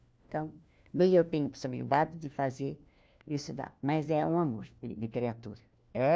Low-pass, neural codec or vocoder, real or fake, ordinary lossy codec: none; codec, 16 kHz, 1 kbps, FunCodec, trained on LibriTTS, 50 frames a second; fake; none